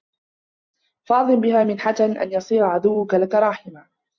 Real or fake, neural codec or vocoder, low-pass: fake; vocoder, 44.1 kHz, 128 mel bands every 256 samples, BigVGAN v2; 7.2 kHz